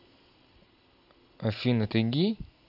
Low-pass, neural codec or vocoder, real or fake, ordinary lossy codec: 5.4 kHz; none; real; AAC, 48 kbps